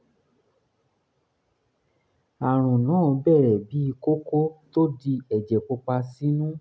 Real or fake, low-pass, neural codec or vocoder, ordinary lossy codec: real; none; none; none